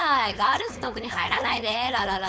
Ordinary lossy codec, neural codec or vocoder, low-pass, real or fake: none; codec, 16 kHz, 4.8 kbps, FACodec; none; fake